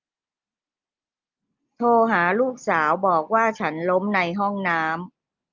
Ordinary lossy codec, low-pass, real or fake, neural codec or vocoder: Opus, 32 kbps; 7.2 kHz; real; none